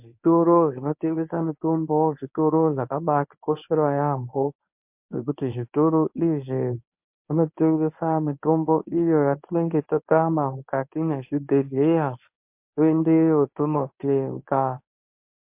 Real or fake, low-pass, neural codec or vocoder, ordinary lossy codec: fake; 3.6 kHz; codec, 24 kHz, 0.9 kbps, WavTokenizer, medium speech release version 1; MP3, 32 kbps